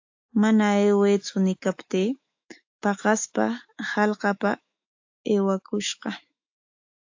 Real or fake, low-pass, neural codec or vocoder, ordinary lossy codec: fake; 7.2 kHz; autoencoder, 48 kHz, 128 numbers a frame, DAC-VAE, trained on Japanese speech; AAC, 48 kbps